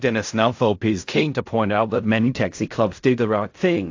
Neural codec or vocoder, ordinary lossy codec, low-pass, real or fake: codec, 16 kHz in and 24 kHz out, 0.4 kbps, LongCat-Audio-Codec, fine tuned four codebook decoder; AAC, 48 kbps; 7.2 kHz; fake